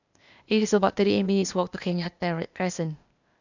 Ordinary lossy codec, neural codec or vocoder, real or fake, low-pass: none; codec, 16 kHz, 0.8 kbps, ZipCodec; fake; 7.2 kHz